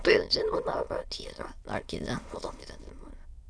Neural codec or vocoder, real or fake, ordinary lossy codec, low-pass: autoencoder, 22.05 kHz, a latent of 192 numbers a frame, VITS, trained on many speakers; fake; none; none